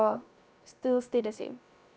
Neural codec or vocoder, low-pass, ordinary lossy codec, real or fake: codec, 16 kHz, 0.7 kbps, FocalCodec; none; none; fake